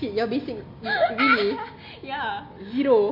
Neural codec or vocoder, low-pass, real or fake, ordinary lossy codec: none; 5.4 kHz; real; AAC, 48 kbps